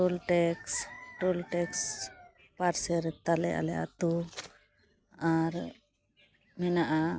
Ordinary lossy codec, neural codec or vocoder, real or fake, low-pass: none; none; real; none